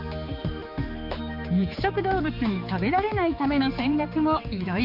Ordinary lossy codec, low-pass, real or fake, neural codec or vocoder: MP3, 48 kbps; 5.4 kHz; fake; codec, 16 kHz, 4 kbps, X-Codec, HuBERT features, trained on general audio